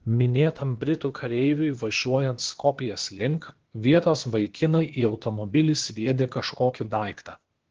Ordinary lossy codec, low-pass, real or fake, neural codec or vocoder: Opus, 16 kbps; 7.2 kHz; fake; codec, 16 kHz, 0.8 kbps, ZipCodec